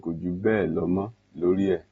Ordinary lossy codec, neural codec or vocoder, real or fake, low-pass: AAC, 24 kbps; none; real; 19.8 kHz